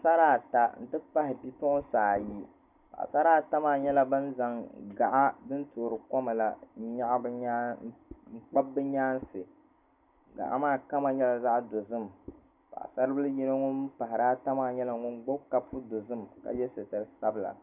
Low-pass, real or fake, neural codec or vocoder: 3.6 kHz; real; none